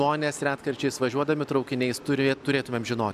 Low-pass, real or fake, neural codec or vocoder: 14.4 kHz; real; none